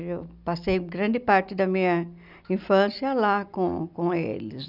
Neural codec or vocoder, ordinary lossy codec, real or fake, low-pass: none; none; real; 5.4 kHz